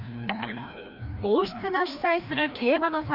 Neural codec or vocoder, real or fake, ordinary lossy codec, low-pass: codec, 16 kHz, 1 kbps, FreqCodec, larger model; fake; none; 5.4 kHz